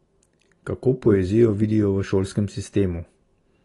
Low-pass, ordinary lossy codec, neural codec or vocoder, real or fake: 10.8 kHz; AAC, 32 kbps; none; real